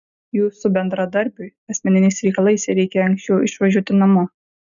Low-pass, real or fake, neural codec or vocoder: 7.2 kHz; real; none